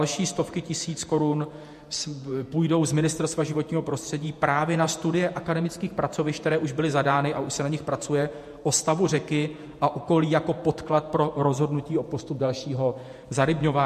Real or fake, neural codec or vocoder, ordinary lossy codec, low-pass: fake; vocoder, 48 kHz, 128 mel bands, Vocos; MP3, 64 kbps; 14.4 kHz